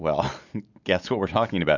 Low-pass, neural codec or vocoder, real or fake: 7.2 kHz; none; real